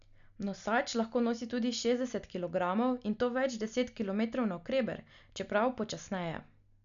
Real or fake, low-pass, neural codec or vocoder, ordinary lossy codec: real; 7.2 kHz; none; none